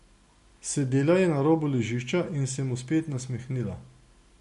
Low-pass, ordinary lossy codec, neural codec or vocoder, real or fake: 14.4 kHz; MP3, 48 kbps; none; real